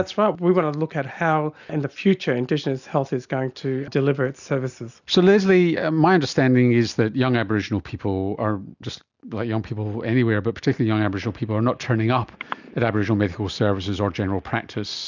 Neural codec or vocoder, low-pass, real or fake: none; 7.2 kHz; real